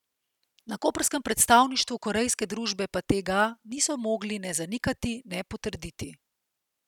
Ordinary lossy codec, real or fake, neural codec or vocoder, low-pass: none; real; none; 19.8 kHz